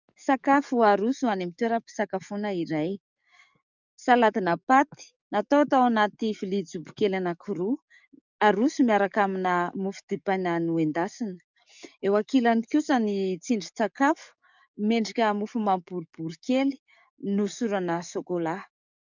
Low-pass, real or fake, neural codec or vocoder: 7.2 kHz; fake; codec, 44.1 kHz, 7.8 kbps, DAC